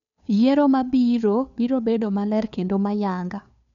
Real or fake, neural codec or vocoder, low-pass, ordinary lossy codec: fake; codec, 16 kHz, 2 kbps, FunCodec, trained on Chinese and English, 25 frames a second; 7.2 kHz; none